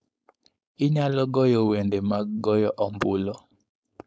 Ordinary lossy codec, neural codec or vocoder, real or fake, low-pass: none; codec, 16 kHz, 4.8 kbps, FACodec; fake; none